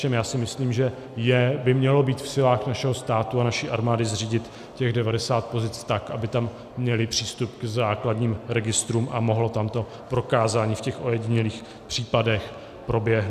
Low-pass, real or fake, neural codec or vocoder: 14.4 kHz; real; none